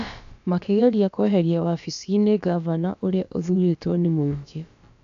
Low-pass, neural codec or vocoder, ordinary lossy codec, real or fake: 7.2 kHz; codec, 16 kHz, about 1 kbps, DyCAST, with the encoder's durations; AAC, 64 kbps; fake